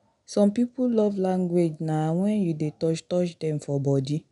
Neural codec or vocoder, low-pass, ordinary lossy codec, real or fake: none; 10.8 kHz; none; real